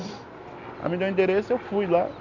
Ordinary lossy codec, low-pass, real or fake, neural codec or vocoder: none; 7.2 kHz; real; none